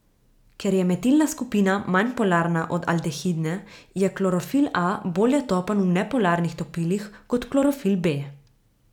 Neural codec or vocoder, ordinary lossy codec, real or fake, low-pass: none; none; real; 19.8 kHz